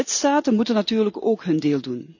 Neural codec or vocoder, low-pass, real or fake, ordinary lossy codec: none; 7.2 kHz; real; none